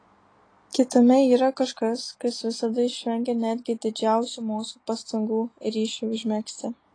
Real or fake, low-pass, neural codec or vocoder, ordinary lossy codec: real; 9.9 kHz; none; AAC, 32 kbps